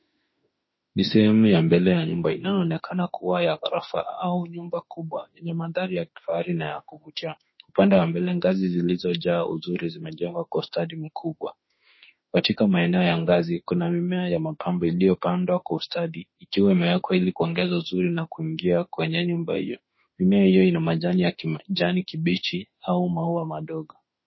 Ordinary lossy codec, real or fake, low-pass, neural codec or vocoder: MP3, 24 kbps; fake; 7.2 kHz; autoencoder, 48 kHz, 32 numbers a frame, DAC-VAE, trained on Japanese speech